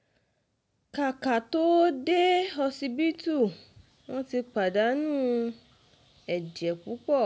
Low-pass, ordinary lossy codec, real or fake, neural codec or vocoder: none; none; real; none